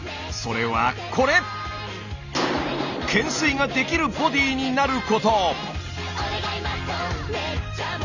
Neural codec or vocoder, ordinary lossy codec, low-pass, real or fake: none; none; 7.2 kHz; real